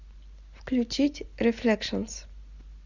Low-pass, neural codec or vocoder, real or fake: 7.2 kHz; none; real